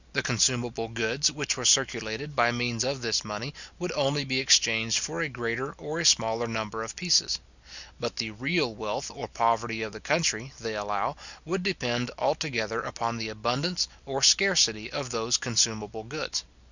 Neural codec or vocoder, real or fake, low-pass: none; real; 7.2 kHz